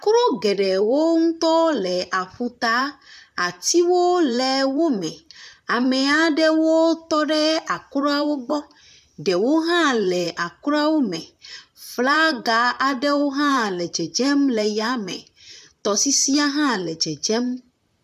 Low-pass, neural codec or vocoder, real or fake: 14.4 kHz; vocoder, 44.1 kHz, 128 mel bands, Pupu-Vocoder; fake